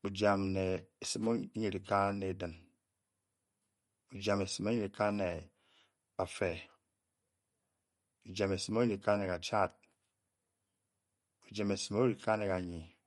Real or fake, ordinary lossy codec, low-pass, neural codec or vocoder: real; MP3, 48 kbps; 19.8 kHz; none